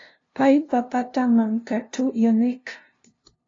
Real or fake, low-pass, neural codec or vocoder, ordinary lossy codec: fake; 7.2 kHz; codec, 16 kHz, 0.5 kbps, FunCodec, trained on LibriTTS, 25 frames a second; AAC, 32 kbps